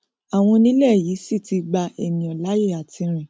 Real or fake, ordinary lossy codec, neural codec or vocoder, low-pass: real; none; none; none